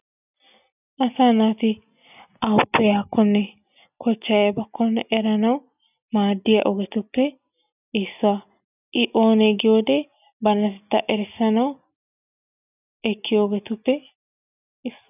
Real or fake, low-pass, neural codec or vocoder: real; 3.6 kHz; none